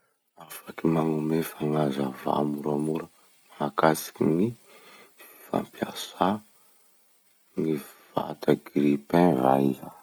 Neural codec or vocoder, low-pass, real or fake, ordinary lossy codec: none; none; real; none